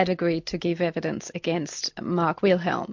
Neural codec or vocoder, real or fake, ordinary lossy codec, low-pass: none; real; MP3, 48 kbps; 7.2 kHz